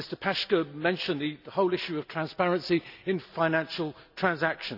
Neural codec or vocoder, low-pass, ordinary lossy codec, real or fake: none; 5.4 kHz; none; real